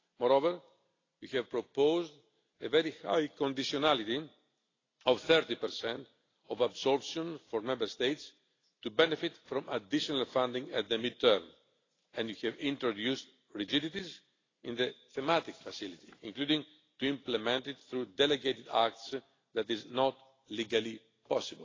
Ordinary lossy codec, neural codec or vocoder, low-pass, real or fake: AAC, 32 kbps; none; 7.2 kHz; real